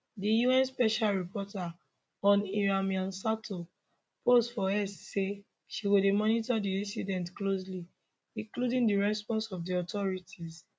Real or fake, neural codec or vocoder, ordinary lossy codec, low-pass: real; none; none; none